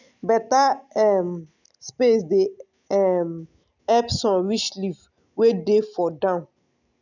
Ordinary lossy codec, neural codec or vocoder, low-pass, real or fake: none; none; 7.2 kHz; real